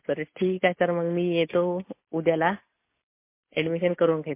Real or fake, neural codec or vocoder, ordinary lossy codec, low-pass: real; none; MP3, 24 kbps; 3.6 kHz